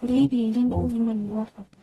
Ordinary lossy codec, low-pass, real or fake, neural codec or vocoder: AAC, 32 kbps; 19.8 kHz; fake; codec, 44.1 kHz, 0.9 kbps, DAC